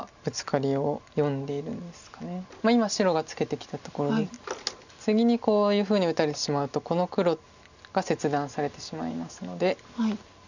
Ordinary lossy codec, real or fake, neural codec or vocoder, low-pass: none; real; none; 7.2 kHz